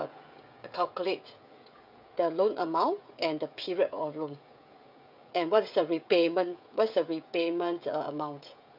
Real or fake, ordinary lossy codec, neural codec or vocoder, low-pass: fake; none; codec, 16 kHz, 16 kbps, FreqCodec, smaller model; 5.4 kHz